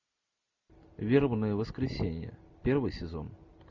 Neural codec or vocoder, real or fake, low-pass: none; real; 7.2 kHz